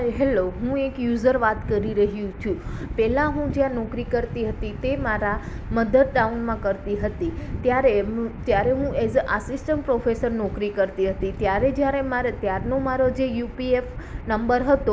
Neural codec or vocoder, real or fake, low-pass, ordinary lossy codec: none; real; none; none